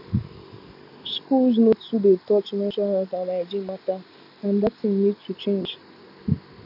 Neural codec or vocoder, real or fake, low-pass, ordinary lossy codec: none; real; 5.4 kHz; none